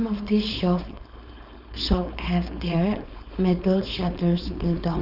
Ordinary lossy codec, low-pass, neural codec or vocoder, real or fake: none; 5.4 kHz; codec, 16 kHz, 4.8 kbps, FACodec; fake